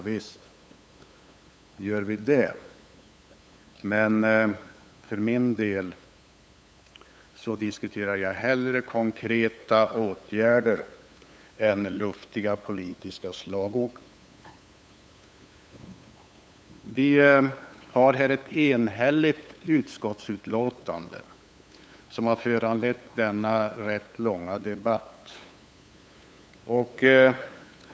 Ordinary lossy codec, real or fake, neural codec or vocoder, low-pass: none; fake; codec, 16 kHz, 8 kbps, FunCodec, trained on LibriTTS, 25 frames a second; none